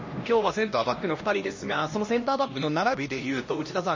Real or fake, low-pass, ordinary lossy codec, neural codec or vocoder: fake; 7.2 kHz; MP3, 32 kbps; codec, 16 kHz, 1 kbps, X-Codec, HuBERT features, trained on LibriSpeech